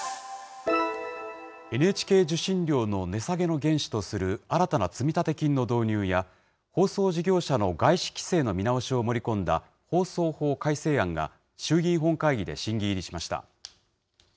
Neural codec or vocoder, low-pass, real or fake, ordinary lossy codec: none; none; real; none